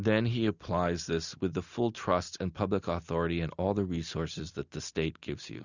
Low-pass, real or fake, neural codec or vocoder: 7.2 kHz; real; none